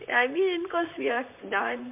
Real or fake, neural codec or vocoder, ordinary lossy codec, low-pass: fake; vocoder, 44.1 kHz, 128 mel bands, Pupu-Vocoder; MP3, 32 kbps; 3.6 kHz